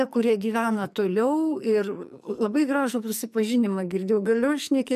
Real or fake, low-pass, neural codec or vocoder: fake; 14.4 kHz; codec, 44.1 kHz, 2.6 kbps, SNAC